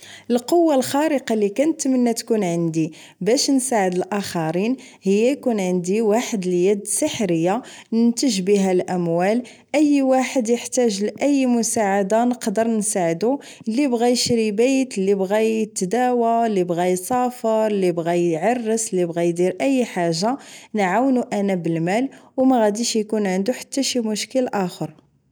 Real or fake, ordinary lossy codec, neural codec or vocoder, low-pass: real; none; none; none